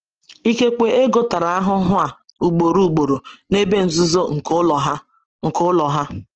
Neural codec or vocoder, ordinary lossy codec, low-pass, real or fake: none; Opus, 16 kbps; 7.2 kHz; real